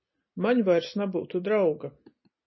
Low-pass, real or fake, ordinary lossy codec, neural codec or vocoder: 7.2 kHz; real; MP3, 24 kbps; none